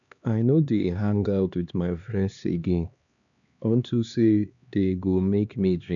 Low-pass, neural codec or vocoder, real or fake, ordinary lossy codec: 7.2 kHz; codec, 16 kHz, 2 kbps, X-Codec, HuBERT features, trained on LibriSpeech; fake; none